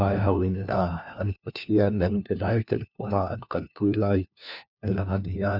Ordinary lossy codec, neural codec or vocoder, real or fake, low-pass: none; codec, 16 kHz, 1 kbps, FunCodec, trained on LibriTTS, 50 frames a second; fake; 5.4 kHz